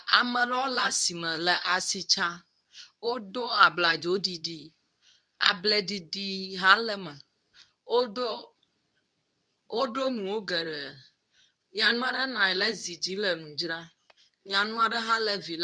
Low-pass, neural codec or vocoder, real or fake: 9.9 kHz; codec, 24 kHz, 0.9 kbps, WavTokenizer, medium speech release version 2; fake